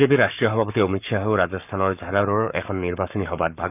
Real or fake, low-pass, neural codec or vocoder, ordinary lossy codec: fake; 3.6 kHz; codec, 44.1 kHz, 7.8 kbps, Pupu-Codec; none